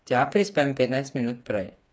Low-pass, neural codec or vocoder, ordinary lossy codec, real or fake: none; codec, 16 kHz, 4 kbps, FreqCodec, smaller model; none; fake